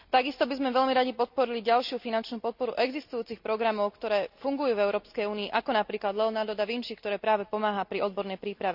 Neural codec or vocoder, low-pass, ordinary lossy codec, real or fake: none; 5.4 kHz; none; real